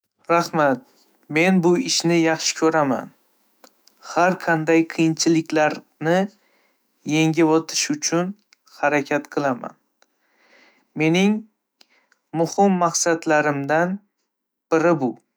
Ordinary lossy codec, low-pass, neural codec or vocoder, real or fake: none; none; autoencoder, 48 kHz, 128 numbers a frame, DAC-VAE, trained on Japanese speech; fake